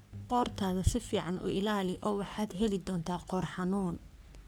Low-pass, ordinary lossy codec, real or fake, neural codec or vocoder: none; none; fake; codec, 44.1 kHz, 3.4 kbps, Pupu-Codec